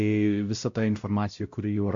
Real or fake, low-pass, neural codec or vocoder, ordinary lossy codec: fake; 7.2 kHz; codec, 16 kHz, 0.5 kbps, X-Codec, WavLM features, trained on Multilingual LibriSpeech; AAC, 64 kbps